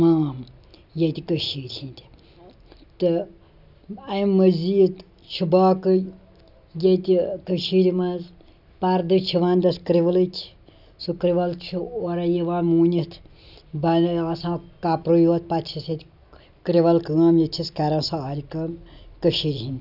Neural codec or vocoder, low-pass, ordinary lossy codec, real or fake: none; 5.4 kHz; none; real